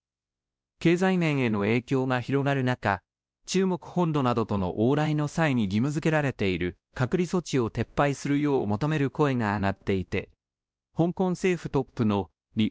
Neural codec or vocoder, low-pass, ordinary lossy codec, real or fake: codec, 16 kHz, 1 kbps, X-Codec, WavLM features, trained on Multilingual LibriSpeech; none; none; fake